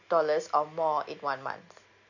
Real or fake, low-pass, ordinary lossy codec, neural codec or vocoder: real; 7.2 kHz; none; none